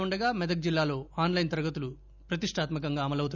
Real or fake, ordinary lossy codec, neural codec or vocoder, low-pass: real; none; none; 7.2 kHz